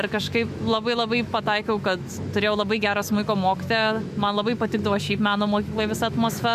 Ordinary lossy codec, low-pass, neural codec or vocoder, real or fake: MP3, 64 kbps; 14.4 kHz; autoencoder, 48 kHz, 128 numbers a frame, DAC-VAE, trained on Japanese speech; fake